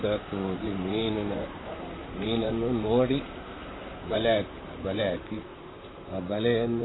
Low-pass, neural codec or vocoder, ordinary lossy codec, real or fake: 7.2 kHz; vocoder, 44.1 kHz, 128 mel bands, Pupu-Vocoder; AAC, 16 kbps; fake